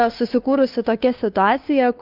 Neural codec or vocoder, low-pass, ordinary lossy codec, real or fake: codec, 16 kHz, 2 kbps, FunCodec, trained on Chinese and English, 25 frames a second; 5.4 kHz; Opus, 24 kbps; fake